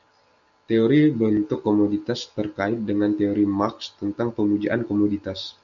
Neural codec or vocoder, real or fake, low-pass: none; real; 7.2 kHz